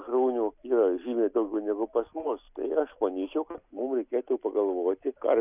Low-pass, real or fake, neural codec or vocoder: 3.6 kHz; real; none